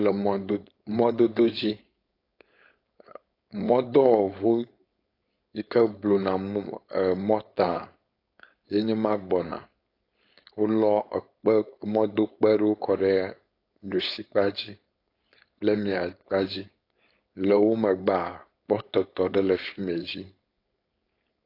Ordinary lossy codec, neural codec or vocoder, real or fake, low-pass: AAC, 32 kbps; codec, 16 kHz, 4.8 kbps, FACodec; fake; 5.4 kHz